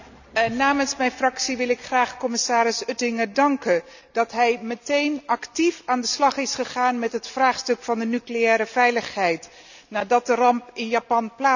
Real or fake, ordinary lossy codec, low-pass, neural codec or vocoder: real; none; 7.2 kHz; none